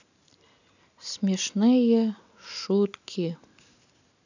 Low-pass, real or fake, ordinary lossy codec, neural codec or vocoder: 7.2 kHz; real; none; none